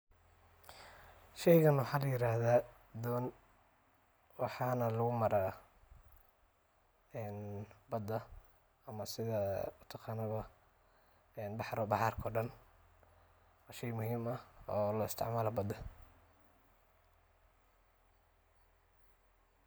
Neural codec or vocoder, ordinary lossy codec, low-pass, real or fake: none; none; none; real